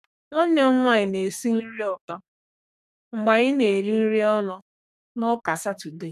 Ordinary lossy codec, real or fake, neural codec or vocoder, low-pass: none; fake; codec, 32 kHz, 1.9 kbps, SNAC; 14.4 kHz